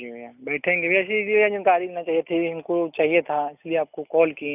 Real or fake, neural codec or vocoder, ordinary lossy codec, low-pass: real; none; none; 3.6 kHz